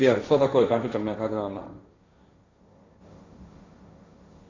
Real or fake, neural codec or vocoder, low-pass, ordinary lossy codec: fake; codec, 16 kHz, 1.1 kbps, Voila-Tokenizer; 7.2 kHz; AAC, 32 kbps